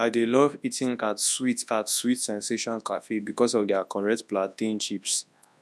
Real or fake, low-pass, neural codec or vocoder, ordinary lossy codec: fake; none; codec, 24 kHz, 0.9 kbps, WavTokenizer, large speech release; none